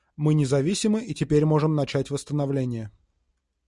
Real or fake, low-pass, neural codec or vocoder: real; 10.8 kHz; none